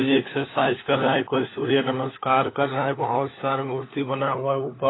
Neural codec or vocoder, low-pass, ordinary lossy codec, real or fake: codec, 16 kHz, 4 kbps, FunCodec, trained on LibriTTS, 50 frames a second; 7.2 kHz; AAC, 16 kbps; fake